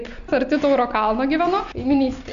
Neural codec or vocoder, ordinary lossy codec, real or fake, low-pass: none; AAC, 96 kbps; real; 7.2 kHz